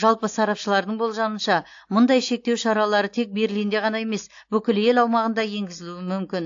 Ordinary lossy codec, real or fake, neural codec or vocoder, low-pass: AAC, 48 kbps; real; none; 7.2 kHz